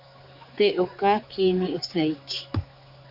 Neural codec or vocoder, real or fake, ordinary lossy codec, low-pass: codec, 16 kHz, 4 kbps, X-Codec, HuBERT features, trained on general audio; fake; AAC, 48 kbps; 5.4 kHz